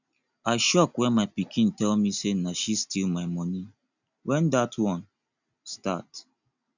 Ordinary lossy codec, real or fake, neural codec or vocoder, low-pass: none; real; none; 7.2 kHz